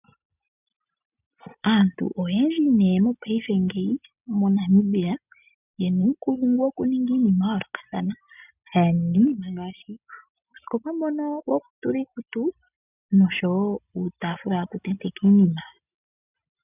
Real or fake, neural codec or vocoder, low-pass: real; none; 3.6 kHz